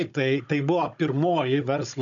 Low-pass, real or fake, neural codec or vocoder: 7.2 kHz; fake; codec, 16 kHz, 16 kbps, FunCodec, trained on Chinese and English, 50 frames a second